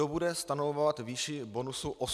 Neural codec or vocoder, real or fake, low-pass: none; real; 14.4 kHz